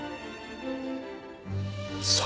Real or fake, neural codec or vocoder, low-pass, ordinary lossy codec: real; none; none; none